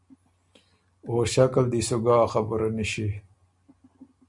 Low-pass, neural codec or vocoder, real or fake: 10.8 kHz; none; real